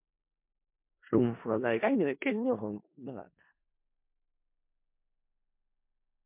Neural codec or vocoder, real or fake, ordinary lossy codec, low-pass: codec, 16 kHz in and 24 kHz out, 0.4 kbps, LongCat-Audio-Codec, four codebook decoder; fake; MP3, 32 kbps; 3.6 kHz